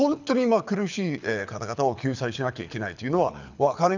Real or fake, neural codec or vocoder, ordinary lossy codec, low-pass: fake; codec, 24 kHz, 6 kbps, HILCodec; none; 7.2 kHz